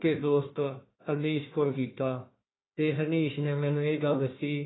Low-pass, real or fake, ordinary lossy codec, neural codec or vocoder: 7.2 kHz; fake; AAC, 16 kbps; codec, 16 kHz, 1 kbps, FunCodec, trained on Chinese and English, 50 frames a second